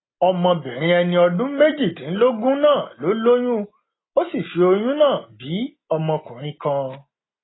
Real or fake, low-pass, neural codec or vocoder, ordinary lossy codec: real; 7.2 kHz; none; AAC, 16 kbps